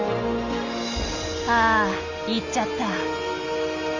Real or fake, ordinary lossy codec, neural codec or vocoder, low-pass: real; Opus, 32 kbps; none; 7.2 kHz